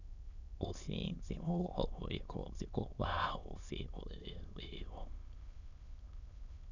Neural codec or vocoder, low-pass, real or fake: autoencoder, 22.05 kHz, a latent of 192 numbers a frame, VITS, trained on many speakers; 7.2 kHz; fake